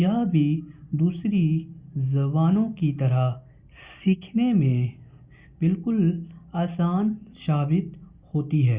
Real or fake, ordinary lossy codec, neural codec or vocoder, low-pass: real; Opus, 64 kbps; none; 3.6 kHz